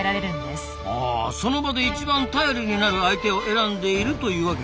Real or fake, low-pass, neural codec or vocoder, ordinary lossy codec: real; none; none; none